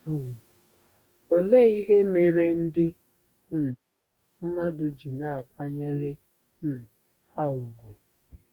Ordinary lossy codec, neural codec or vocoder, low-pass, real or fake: Opus, 64 kbps; codec, 44.1 kHz, 2.6 kbps, DAC; 19.8 kHz; fake